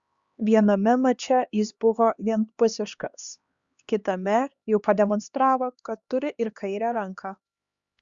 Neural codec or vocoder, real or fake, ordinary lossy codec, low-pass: codec, 16 kHz, 2 kbps, X-Codec, HuBERT features, trained on LibriSpeech; fake; Opus, 64 kbps; 7.2 kHz